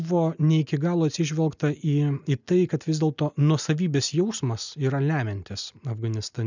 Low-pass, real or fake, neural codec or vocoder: 7.2 kHz; real; none